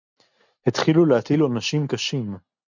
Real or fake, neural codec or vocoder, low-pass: real; none; 7.2 kHz